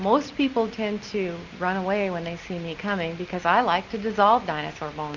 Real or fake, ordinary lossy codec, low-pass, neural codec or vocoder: real; Opus, 64 kbps; 7.2 kHz; none